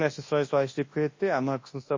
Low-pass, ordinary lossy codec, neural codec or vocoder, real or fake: 7.2 kHz; MP3, 32 kbps; codec, 24 kHz, 0.9 kbps, WavTokenizer, large speech release; fake